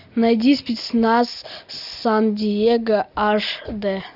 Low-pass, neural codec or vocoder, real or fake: 5.4 kHz; none; real